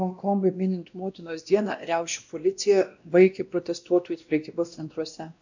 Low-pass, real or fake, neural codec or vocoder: 7.2 kHz; fake; codec, 16 kHz, 1 kbps, X-Codec, WavLM features, trained on Multilingual LibriSpeech